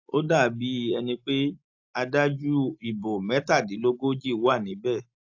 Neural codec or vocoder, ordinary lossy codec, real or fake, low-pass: none; AAC, 48 kbps; real; 7.2 kHz